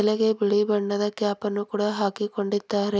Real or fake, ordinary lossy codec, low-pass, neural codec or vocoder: real; none; none; none